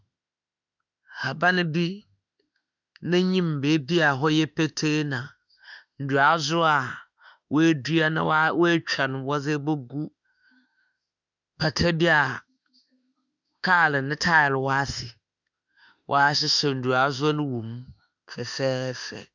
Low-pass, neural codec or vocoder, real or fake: 7.2 kHz; autoencoder, 48 kHz, 32 numbers a frame, DAC-VAE, trained on Japanese speech; fake